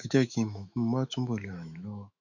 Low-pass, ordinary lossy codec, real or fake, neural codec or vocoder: 7.2 kHz; none; fake; autoencoder, 48 kHz, 128 numbers a frame, DAC-VAE, trained on Japanese speech